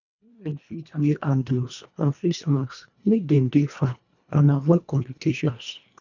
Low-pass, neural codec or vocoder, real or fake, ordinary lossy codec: 7.2 kHz; codec, 24 kHz, 1.5 kbps, HILCodec; fake; none